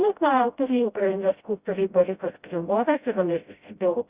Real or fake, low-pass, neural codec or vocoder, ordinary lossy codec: fake; 3.6 kHz; codec, 16 kHz, 0.5 kbps, FreqCodec, smaller model; Opus, 64 kbps